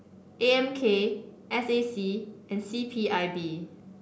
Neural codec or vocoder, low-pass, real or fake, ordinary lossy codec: none; none; real; none